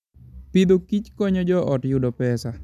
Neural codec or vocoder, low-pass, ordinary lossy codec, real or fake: none; 14.4 kHz; none; real